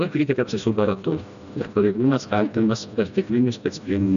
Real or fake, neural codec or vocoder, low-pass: fake; codec, 16 kHz, 1 kbps, FreqCodec, smaller model; 7.2 kHz